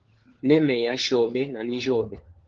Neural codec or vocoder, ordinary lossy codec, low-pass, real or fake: codec, 16 kHz, 4 kbps, FunCodec, trained on LibriTTS, 50 frames a second; Opus, 16 kbps; 7.2 kHz; fake